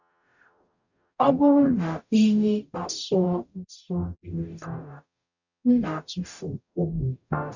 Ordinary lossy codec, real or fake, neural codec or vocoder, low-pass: none; fake; codec, 44.1 kHz, 0.9 kbps, DAC; 7.2 kHz